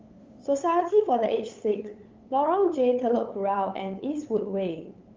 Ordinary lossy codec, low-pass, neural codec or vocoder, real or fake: Opus, 32 kbps; 7.2 kHz; codec, 16 kHz, 8 kbps, FunCodec, trained on LibriTTS, 25 frames a second; fake